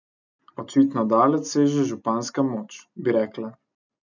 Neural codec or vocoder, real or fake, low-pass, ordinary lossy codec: none; real; 7.2 kHz; none